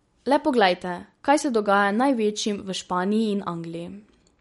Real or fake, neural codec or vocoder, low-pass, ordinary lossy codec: real; none; 19.8 kHz; MP3, 48 kbps